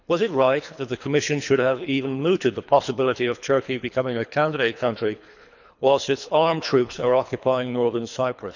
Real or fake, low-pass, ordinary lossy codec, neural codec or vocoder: fake; 7.2 kHz; none; codec, 24 kHz, 3 kbps, HILCodec